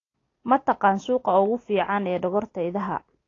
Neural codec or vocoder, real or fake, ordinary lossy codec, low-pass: none; real; AAC, 32 kbps; 7.2 kHz